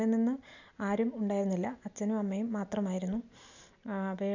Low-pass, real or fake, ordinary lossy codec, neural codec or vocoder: 7.2 kHz; real; none; none